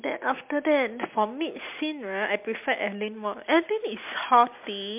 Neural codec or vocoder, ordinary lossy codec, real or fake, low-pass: none; MP3, 32 kbps; real; 3.6 kHz